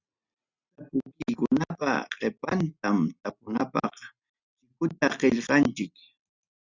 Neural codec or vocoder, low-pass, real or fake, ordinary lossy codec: none; 7.2 kHz; real; Opus, 64 kbps